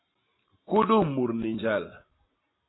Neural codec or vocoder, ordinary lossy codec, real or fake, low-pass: none; AAC, 16 kbps; real; 7.2 kHz